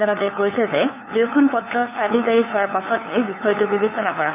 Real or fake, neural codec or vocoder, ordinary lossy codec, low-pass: fake; codec, 16 kHz, 8 kbps, FunCodec, trained on LibriTTS, 25 frames a second; none; 3.6 kHz